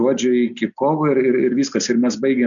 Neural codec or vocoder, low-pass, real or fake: none; 7.2 kHz; real